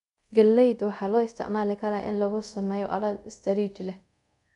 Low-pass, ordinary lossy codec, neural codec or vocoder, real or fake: 10.8 kHz; none; codec, 24 kHz, 0.5 kbps, DualCodec; fake